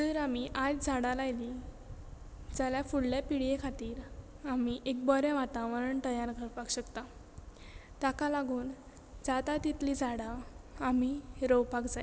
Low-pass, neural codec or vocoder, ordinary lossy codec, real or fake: none; none; none; real